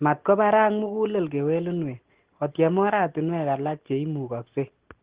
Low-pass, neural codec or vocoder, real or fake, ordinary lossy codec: 3.6 kHz; none; real; Opus, 16 kbps